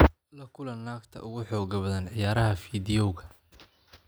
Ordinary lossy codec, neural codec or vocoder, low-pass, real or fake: none; none; none; real